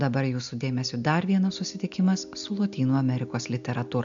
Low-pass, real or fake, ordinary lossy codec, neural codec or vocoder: 7.2 kHz; real; AAC, 64 kbps; none